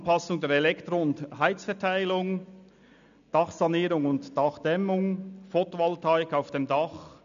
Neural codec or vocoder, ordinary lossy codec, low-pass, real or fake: none; MP3, 96 kbps; 7.2 kHz; real